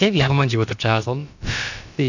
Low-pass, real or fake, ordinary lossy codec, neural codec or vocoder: 7.2 kHz; fake; none; codec, 16 kHz, about 1 kbps, DyCAST, with the encoder's durations